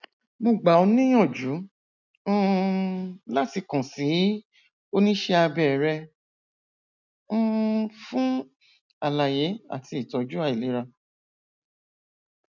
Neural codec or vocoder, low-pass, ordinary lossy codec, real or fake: none; 7.2 kHz; none; real